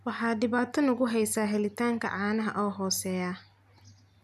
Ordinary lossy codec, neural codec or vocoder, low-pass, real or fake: none; none; 14.4 kHz; real